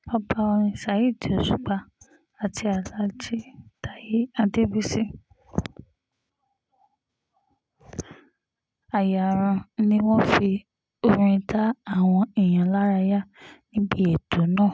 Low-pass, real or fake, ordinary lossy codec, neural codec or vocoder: none; real; none; none